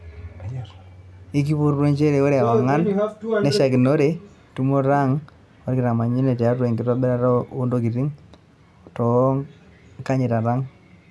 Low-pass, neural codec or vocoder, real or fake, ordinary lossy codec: none; none; real; none